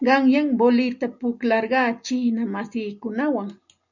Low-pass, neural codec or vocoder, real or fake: 7.2 kHz; none; real